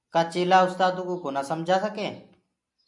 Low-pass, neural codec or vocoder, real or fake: 10.8 kHz; none; real